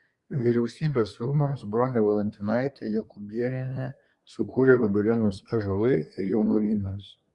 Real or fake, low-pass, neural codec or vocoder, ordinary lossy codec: fake; 10.8 kHz; codec, 24 kHz, 1 kbps, SNAC; Opus, 64 kbps